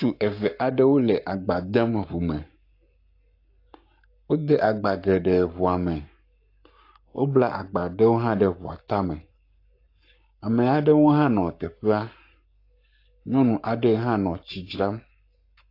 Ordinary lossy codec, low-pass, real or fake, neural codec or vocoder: AAC, 32 kbps; 5.4 kHz; fake; codec, 44.1 kHz, 7.8 kbps, Pupu-Codec